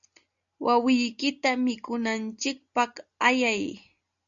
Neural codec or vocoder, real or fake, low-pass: none; real; 7.2 kHz